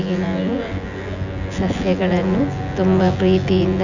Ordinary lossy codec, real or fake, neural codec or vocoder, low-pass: none; fake; vocoder, 24 kHz, 100 mel bands, Vocos; 7.2 kHz